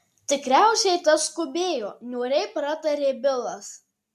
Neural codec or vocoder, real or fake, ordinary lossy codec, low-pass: none; real; MP3, 64 kbps; 14.4 kHz